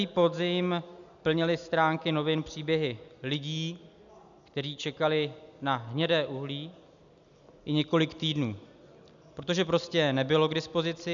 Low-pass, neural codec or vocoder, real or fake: 7.2 kHz; none; real